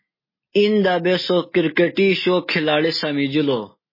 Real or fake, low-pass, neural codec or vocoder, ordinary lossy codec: real; 5.4 kHz; none; MP3, 24 kbps